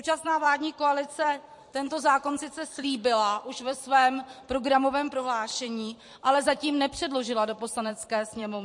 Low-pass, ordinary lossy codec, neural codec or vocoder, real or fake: 10.8 kHz; MP3, 48 kbps; vocoder, 44.1 kHz, 128 mel bands every 256 samples, BigVGAN v2; fake